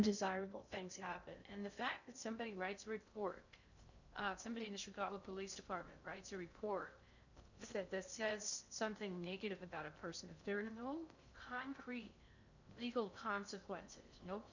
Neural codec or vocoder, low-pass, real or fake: codec, 16 kHz in and 24 kHz out, 0.6 kbps, FocalCodec, streaming, 2048 codes; 7.2 kHz; fake